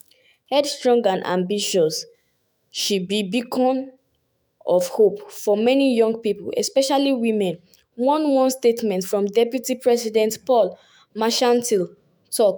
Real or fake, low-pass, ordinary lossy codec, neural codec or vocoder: fake; none; none; autoencoder, 48 kHz, 128 numbers a frame, DAC-VAE, trained on Japanese speech